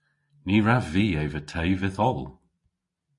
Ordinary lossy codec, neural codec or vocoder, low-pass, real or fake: MP3, 48 kbps; none; 10.8 kHz; real